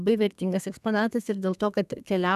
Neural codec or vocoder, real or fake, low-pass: codec, 32 kHz, 1.9 kbps, SNAC; fake; 14.4 kHz